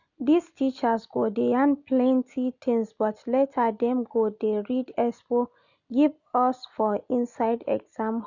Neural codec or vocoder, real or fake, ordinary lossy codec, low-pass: none; real; none; 7.2 kHz